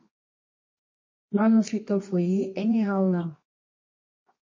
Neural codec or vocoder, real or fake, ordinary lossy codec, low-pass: codec, 24 kHz, 0.9 kbps, WavTokenizer, medium music audio release; fake; MP3, 32 kbps; 7.2 kHz